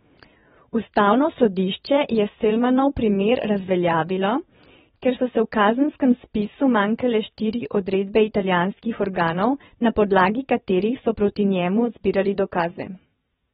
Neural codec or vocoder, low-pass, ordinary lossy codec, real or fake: vocoder, 22.05 kHz, 80 mel bands, WaveNeXt; 9.9 kHz; AAC, 16 kbps; fake